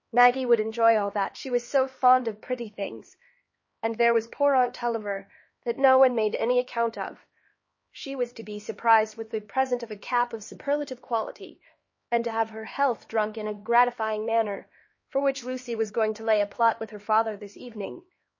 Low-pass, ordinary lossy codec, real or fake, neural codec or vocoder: 7.2 kHz; MP3, 32 kbps; fake; codec, 16 kHz, 2 kbps, X-Codec, HuBERT features, trained on LibriSpeech